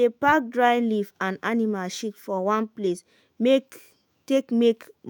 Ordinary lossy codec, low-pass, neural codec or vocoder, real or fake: none; none; autoencoder, 48 kHz, 128 numbers a frame, DAC-VAE, trained on Japanese speech; fake